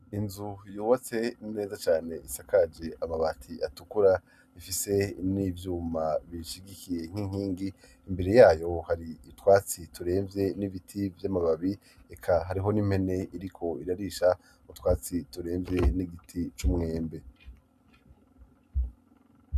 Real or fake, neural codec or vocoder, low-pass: real; none; 14.4 kHz